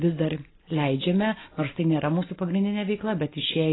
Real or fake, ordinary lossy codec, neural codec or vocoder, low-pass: real; AAC, 16 kbps; none; 7.2 kHz